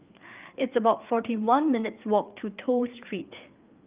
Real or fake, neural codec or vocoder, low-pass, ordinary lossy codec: fake; codec, 16 kHz, 4 kbps, FreqCodec, larger model; 3.6 kHz; Opus, 24 kbps